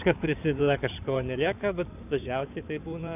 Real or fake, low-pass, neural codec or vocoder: fake; 3.6 kHz; codec, 16 kHz in and 24 kHz out, 2.2 kbps, FireRedTTS-2 codec